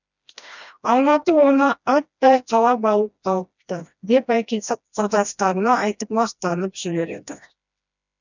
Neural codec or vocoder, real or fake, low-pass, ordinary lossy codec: codec, 16 kHz, 1 kbps, FreqCodec, smaller model; fake; 7.2 kHz; none